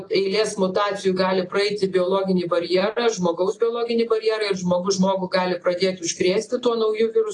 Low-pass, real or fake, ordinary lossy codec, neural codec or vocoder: 10.8 kHz; real; AAC, 32 kbps; none